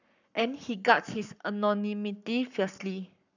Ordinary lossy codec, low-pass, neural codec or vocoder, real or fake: none; 7.2 kHz; codec, 44.1 kHz, 7.8 kbps, Pupu-Codec; fake